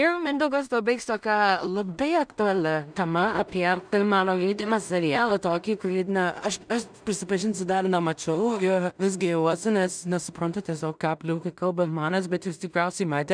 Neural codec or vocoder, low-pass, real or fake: codec, 16 kHz in and 24 kHz out, 0.4 kbps, LongCat-Audio-Codec, two codebook decoder; 9.9 kHz; fake